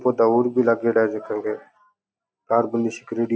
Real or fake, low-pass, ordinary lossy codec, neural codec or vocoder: real; none; none; none